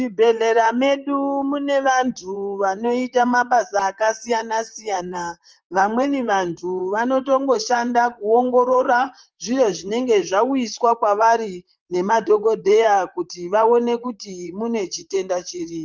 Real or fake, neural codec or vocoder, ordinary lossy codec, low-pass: fake; vocoder, 44.1 kHz, 128 mel bands, Pupu-Vocoder; Opus, 24 kbps; 7.2 kHz